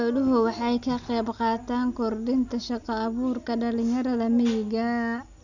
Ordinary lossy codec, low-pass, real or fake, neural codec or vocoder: none; 7.2 kHz; real; none